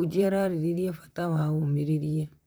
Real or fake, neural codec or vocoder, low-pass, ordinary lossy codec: fake; vocoder, 44.1 kHz, 128 mel bands, Pupu-Vocoder; none; none